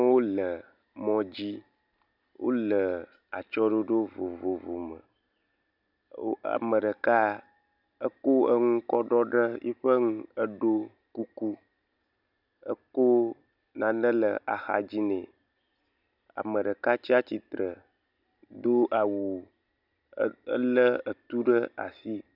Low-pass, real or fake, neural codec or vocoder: 5.4 kHz; real; none